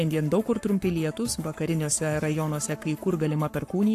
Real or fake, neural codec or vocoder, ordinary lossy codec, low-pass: fake; codec, 44.1 kHz, 7.8 kbps, Pupu-Codec; AAC, 64 kbps; 14.4 kHz